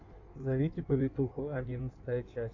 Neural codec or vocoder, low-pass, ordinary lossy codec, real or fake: codec, 16 kHz in and 24 kHz out, 1.1 kbps, FireRedTTS-2 codec; 7.2 kHz; AAC, 48 kbps; fake